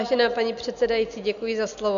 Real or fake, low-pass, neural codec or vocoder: real; 7.2 kHz; none